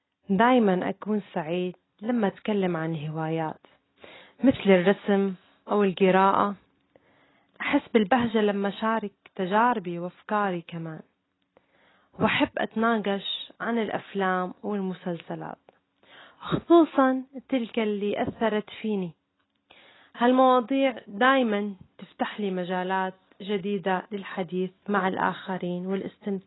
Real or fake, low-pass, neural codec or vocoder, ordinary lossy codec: real; 7.2 kHz; none; AAC, 16 kbps